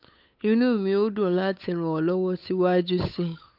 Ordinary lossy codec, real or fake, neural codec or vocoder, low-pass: none; fake; codec, 16 kHz, 8 kbps, FunCodec, trained on Chinese and English, 25 frames a second; 5.4 kHz